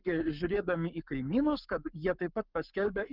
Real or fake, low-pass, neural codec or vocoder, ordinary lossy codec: fake; 5.4 kHz; codec, 44.1 kHz, 7.8 kbps, Pupu-Codec; Opus, 24 kbps